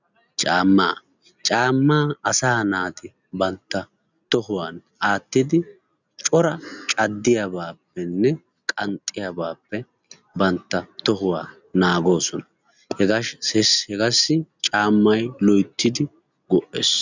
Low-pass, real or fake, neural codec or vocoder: 7.2 kHz; real; none